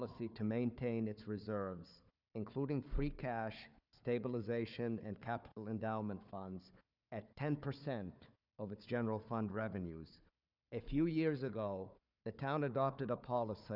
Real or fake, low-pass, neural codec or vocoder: fake; 5.4 kHz; codec, 16 kHz, 4 kbps, FunCodec, trained on Chinese and English, 50 frames a second